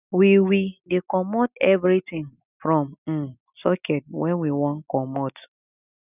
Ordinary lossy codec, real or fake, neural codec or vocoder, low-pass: none; real; none; 3.6 kHz